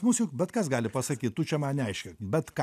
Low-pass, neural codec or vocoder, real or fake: 14.4 kHz; none; real